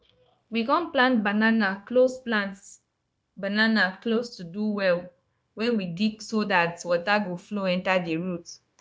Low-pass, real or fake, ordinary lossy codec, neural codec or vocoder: none; fake; none; codec, 16 kHz, 0.9 kbps, LongCat-Audio-Codec